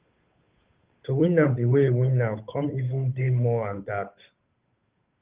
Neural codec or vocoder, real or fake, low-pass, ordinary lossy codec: codec, 16 kHz, 8 kbps, FunCodec, trained on Chinese and English, 25 frames a second; fake; 3.6 kHz; Opus, 24 kbps